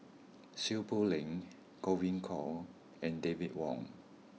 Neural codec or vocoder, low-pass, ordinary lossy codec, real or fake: none; none; none; real